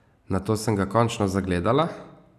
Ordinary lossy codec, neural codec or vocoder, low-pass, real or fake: none; none; 14.4 kHz; real